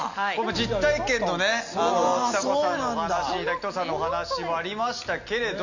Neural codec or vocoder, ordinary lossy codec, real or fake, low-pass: none; none; real; 7.2 kHz